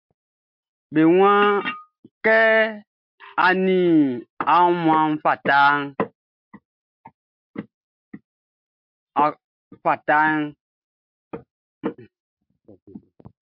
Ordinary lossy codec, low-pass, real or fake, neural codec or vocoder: MP3, 48 kbps; 5.4 kHz; real; none